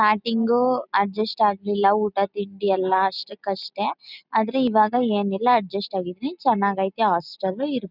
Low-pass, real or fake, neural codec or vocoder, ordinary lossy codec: 5.4 kHz; real; none; none